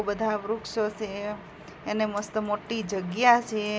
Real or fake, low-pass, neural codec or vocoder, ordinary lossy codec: real; none; none; none